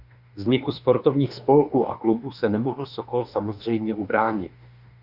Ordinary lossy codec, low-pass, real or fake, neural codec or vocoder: Opus, 64 kbps; 5.4 kHz; fake; autoencoder, 48 kHz, 32 numbers a frame, DAC-VAE, trained on Japanese speech